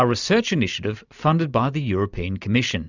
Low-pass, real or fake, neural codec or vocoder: 7.2 kHz; real; none